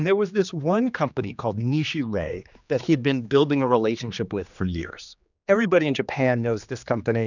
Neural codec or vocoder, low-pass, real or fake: codec, 16 kHz, 2 kbps, X-Codec, HuBERT features, trained on general audio; 7.2 kHz; fake